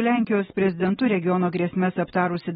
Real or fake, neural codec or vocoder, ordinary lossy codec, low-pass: real; none; AAC, 16 kbps; 19.8 kHz